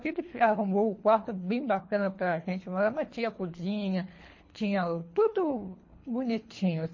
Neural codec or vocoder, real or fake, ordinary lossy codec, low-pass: codec, 24 kHz, 3 kbps, HILCodec; fake; MP3, 32 kbps; 7.2 kHz